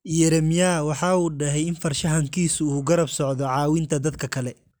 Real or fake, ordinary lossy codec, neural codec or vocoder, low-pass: real; none; none; none